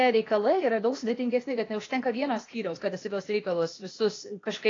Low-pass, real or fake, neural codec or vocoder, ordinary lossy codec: 7.2 kHz; fake; codec, 16 kHz, 0.8 kbps, ZipCodec; AAC, 32 kbps